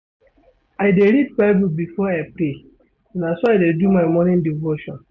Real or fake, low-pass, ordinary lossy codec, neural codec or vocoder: real; none; none; none